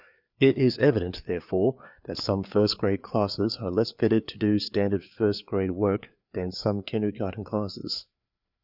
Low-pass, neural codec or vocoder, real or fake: 5.4 kHz; codec, 16 kHz, 4 kbps, FreqCodec, larger model; fake